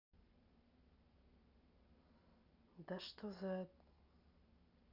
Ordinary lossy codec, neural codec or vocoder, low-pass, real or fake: none; none; 5.4 kHz; real